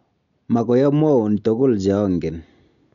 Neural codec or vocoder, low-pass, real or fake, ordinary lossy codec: none; 7.2 kHz; real; none